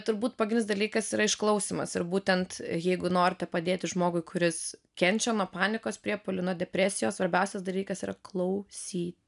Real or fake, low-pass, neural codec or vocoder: real; 10.8 kHz; none